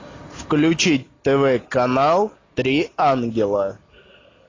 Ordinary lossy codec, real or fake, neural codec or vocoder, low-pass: AAC, 32 kbps; real; none; 7.2 kHz